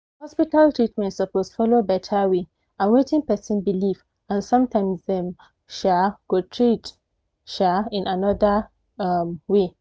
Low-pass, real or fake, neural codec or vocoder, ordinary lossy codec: none; real; none; none